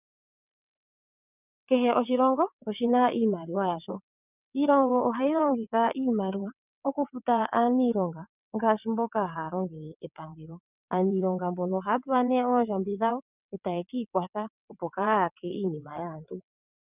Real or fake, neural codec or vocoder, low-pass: fake; vocoder, 22.05 kHz, 80 mel bands, WaveNeXt; 3.6 kHz